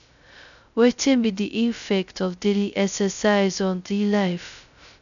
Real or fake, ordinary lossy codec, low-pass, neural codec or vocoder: fake; none; 7.2 kHz; codec, 16 kHz, 0.2 kbps, FocalCodec